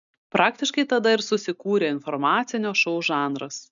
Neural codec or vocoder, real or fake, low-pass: none; real; 7.2 kHz